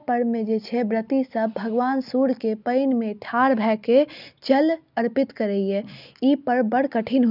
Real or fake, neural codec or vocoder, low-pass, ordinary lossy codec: real; none; 5.4 kHz; none